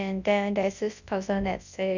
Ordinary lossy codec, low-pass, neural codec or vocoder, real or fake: none; 7.2 kHz; codec, 24 kHz, 0.9 kbps, WavTokenizer, large speech release; fake